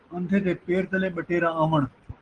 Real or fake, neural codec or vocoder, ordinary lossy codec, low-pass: real; none; Opus, 16 kbps; 9.9 kHz